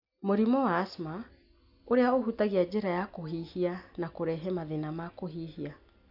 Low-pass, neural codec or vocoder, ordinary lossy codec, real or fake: 5.4 kHz; none; none; real